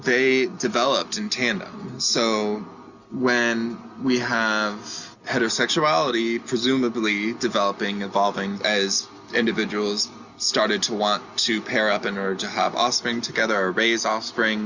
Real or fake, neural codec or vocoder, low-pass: real; none; 7.2 kHz